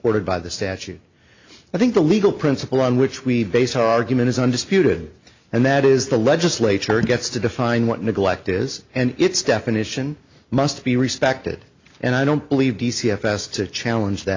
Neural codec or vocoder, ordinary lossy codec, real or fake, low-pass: none; MP3, 64 kbps; real; 7.2 kHz